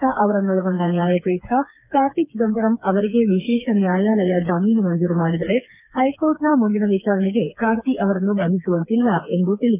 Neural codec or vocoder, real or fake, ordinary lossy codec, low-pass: codec, 16 kHz, 4 kbps, FreqCodec, smaller model; fake; none; 3.6 kHz